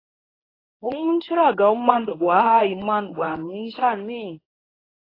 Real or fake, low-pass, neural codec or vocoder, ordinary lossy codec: fake; 5.4 kHz; codec, 24 kHz, 0.9 kbps, WavTokenizer, medium speech release version 1; AAC, 24 kbps